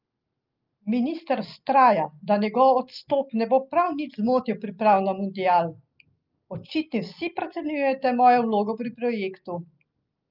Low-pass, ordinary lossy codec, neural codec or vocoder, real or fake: 5.4 kHz; Opus, 24 kbps; none; real